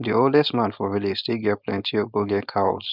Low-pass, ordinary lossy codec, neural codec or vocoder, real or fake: 5.4 kHz; none; codec, 16 kHz, 4.8 kbps, FACodec; fake